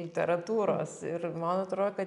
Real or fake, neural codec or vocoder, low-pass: real; none; 10.8 kHz